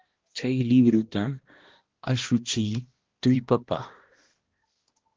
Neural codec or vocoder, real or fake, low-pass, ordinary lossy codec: codec, 16 kHz, 1 kbps, X-Codec, HuBERT features, trained on balanced general audio; fake; 7.2 kHz; Opus, 16 kbps